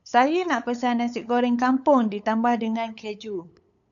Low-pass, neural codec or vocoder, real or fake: 7.2 kHz; codec, 16 kHz, 8 kbps, FunCodec, trained on LibriTTS, 25 frames a second; fake